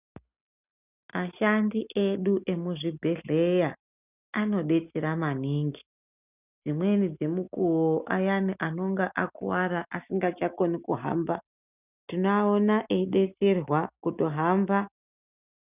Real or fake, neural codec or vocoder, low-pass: real; none; 3.6 kHz